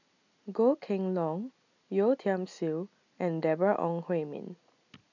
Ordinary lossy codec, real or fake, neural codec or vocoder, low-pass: none; real; none; 7.2 kHz